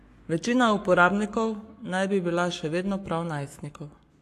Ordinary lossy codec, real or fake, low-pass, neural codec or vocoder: AAC, 64 kbps; fake; 14.4 kHz; codec, 44.1 kHz, 7.8 kbps, Pupu-Codec